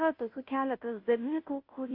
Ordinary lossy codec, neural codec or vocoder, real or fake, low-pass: AAC, 32 kbps; codec, 16 kHz, 0.5 kbps, FunCodec, trained on Chinese and English, 25 frames a second; fake; 5.4 kHz